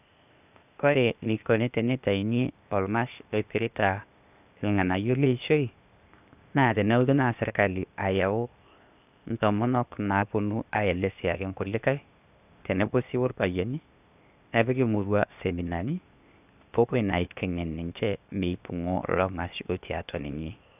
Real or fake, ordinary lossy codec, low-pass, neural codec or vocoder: fake; none; 3.6 kHz; codec, 16 kHz, 0.8 kbps, ZipCodec